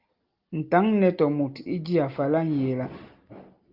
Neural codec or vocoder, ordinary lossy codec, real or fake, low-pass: none; Opus, 32 kbps; real; 5.4 kHz